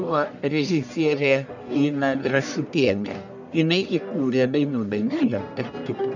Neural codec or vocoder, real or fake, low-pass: codec, 44.1 kHz, 1.7 kbps, Pupu-Codec; fake; 7.2 kHz